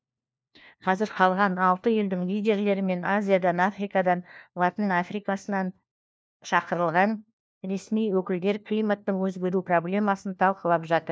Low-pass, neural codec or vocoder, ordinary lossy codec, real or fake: none; codec, 16 kHz, 1 kbps, FunCodec, trained on LibriTTS, 50 frames a second; none; fake